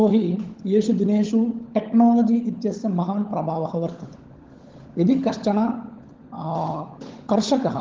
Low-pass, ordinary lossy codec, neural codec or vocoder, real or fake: 7.2 kHz; Opus, 16 kbps; codec, 16 kHz, 16 kbps, FunCodec, trained on LibriTTS, 50 frames a second; fake